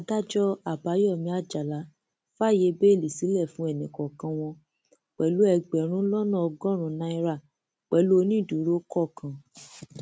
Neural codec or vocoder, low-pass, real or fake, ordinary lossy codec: none; none; real; none